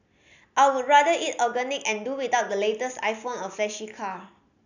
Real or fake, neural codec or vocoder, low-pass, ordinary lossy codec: real; none; 7.2 kHz; none